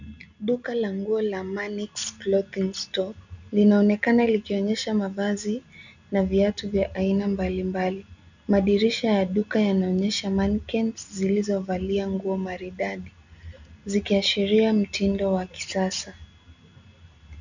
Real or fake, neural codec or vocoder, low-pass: real; none; 7.2 kHz